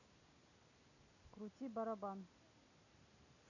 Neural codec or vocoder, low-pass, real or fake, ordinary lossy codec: none; 7.2 kHz; real; none